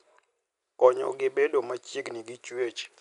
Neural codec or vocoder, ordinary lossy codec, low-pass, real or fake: none; none; 10.8 kHz; real